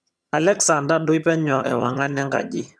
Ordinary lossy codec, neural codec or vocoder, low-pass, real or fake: none; vocoder, 22.05 kHz, 80 mel bands, HiFi-GAN; none; fake